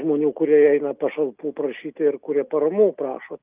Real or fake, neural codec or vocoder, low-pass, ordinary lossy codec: real; none; 3.6 kHz; Opus, 24 kbps